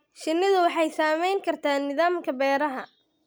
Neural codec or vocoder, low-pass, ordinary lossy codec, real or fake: none; none; none; real